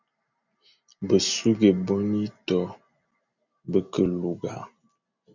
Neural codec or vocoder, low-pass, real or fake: none; 7.2 kHz; real